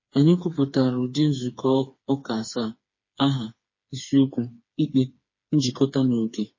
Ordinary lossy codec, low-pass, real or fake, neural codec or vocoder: MP3, 32 kbps; 7.2 kHz; fake; codec, 16 kHz, 4 kbps, FreqCodec, smaller model